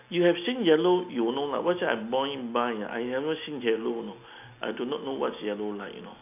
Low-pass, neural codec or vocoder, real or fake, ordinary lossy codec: 3.6 kHz; none; real; none